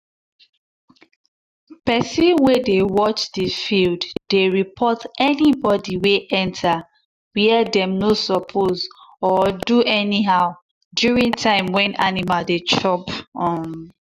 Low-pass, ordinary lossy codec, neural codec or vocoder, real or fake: 14.4 kHz; none; none; real